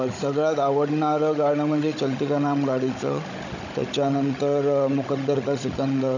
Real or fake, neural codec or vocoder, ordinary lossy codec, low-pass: fake; codec, 16 kHz, 16 kbps, FunCodec, trained on Chinese and English, 50 frames a second; none; 7.2 kHz